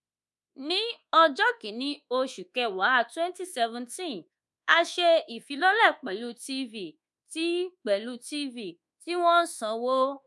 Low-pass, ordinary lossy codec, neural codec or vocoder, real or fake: none; none; codec, 24 kHz, 1.2 kbps, DualCodec; fake